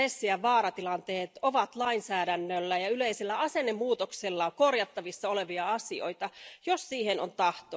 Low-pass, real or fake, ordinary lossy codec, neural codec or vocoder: none; real; none; none